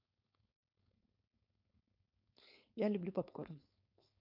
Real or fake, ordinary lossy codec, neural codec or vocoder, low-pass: fake; none; codec, 16 kHz, 4.8 kbps, FACodec; 5.4 kHz